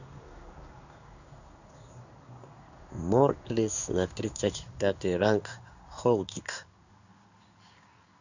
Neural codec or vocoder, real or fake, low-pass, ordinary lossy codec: codec, 16 kHz in and 24 kHz out, 1 kbps, XY-Tokenizer; fake; 7.2 kHz; none